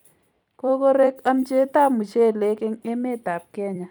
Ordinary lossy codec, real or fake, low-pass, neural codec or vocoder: none; fake; 19.8 kHz; vocoder, 44.1 kHz, 128 mel bands every 256 samples, BigVGAN v2